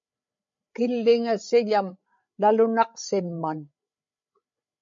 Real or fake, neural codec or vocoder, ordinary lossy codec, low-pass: fake; codec, 16 kHz, 8 kbps, FreqCodec, larger model; MP3, 48 kbps; 7.2 kHz